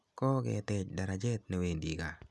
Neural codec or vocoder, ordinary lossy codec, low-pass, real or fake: none; none; none; real